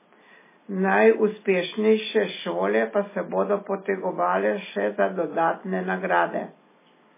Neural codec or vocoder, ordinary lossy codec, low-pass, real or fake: none; MP3, 16 kbps; 3.6 kHz; real